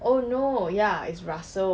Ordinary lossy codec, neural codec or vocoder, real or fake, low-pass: none; none; real; none